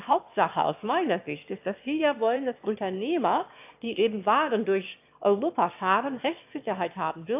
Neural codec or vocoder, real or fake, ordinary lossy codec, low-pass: autoencoder, 22.05 kHz, a latent of 192 numbers a frame, VITS, trained on one speaker; fake; AAC, 32 kbps; 3.6 kHz